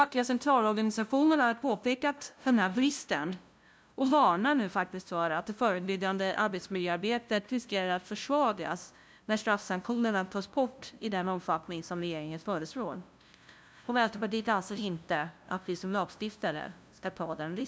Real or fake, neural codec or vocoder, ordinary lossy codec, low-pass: fake; codec, 16 kHz, 0.5 kbps, FunCodec, trained on LibriTTS, 25 frames a second; none; none